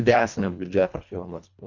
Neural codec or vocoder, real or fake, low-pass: codec, 24 kHz, 1.5 kbps, HILCodec; fake; 7.2 kHz